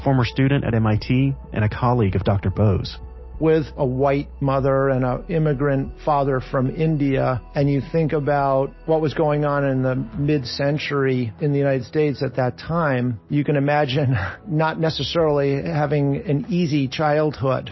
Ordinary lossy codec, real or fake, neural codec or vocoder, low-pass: MP3, 24 kbps; real; none; 7.2 kHz